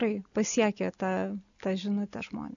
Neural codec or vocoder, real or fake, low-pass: none; real; 7.2 kHz